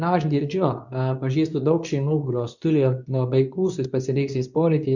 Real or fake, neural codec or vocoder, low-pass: fake; codec, 24 kHz, 0.9 kbps, WavTokenizer, medium speech release version 2; 7.2 kHz